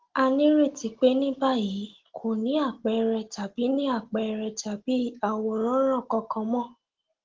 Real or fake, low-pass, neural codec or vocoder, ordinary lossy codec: real; 7.2 kHz; none; Opus, 16 kbps